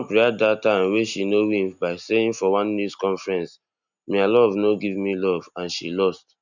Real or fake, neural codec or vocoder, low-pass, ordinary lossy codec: real; none; 7.2 kHz; none